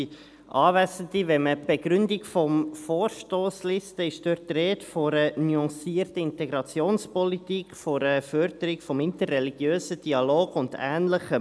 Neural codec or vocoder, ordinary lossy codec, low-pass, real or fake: none; none; none; real